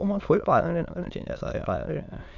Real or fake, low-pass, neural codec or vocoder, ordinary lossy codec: fake; 7.2 kHz; autoencoder, 22.05 kHz, a latent of 192 numbers a frame, VITS, trained on many speakers; none